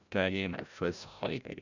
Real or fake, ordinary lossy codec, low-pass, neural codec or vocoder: fake; none; 7.2 kHz; codec, 16 kHz, 0.5 kbps, FreqCodec, larger model